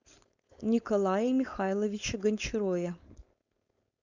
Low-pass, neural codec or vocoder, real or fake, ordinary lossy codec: 7.2 kHz; codec, 16 kHz, 4.8 kbps, FACodec; fake; Opus, 64 kbps